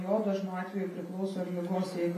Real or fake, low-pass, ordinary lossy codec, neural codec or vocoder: real; 14.4 kHz; MP3, 64 kbps; none